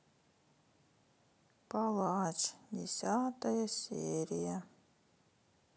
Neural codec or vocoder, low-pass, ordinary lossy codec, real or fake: none; none; none; real